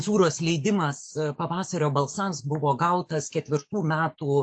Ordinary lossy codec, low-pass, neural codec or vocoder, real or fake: AAC, 64 kbps; 9.9 kHz; codec, 44.1 kHz, 7.8 kbps, DAC; fake